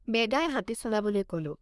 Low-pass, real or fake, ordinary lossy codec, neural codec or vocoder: none; fake; none; codec, 24 kHz, 1 kbps, SNAC